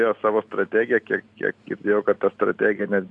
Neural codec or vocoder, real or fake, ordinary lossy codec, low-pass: none; real; AAC, 64 kbps; 10.8 kHz